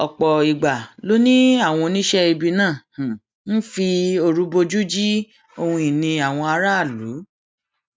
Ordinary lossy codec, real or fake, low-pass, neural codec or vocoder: none; real; none; none